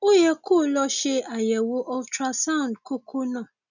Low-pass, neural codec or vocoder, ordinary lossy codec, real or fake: 7.2 kHz; none; none; real